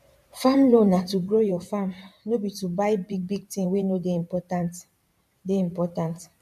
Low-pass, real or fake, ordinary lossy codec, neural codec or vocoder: 14.4 kHz; fake; none; vocoder, 44.1 kHz, 128 mel bands every 512 samples, BigVGAN v2